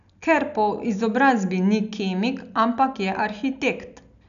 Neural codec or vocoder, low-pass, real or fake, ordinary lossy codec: none; 7.2 kHz; real; none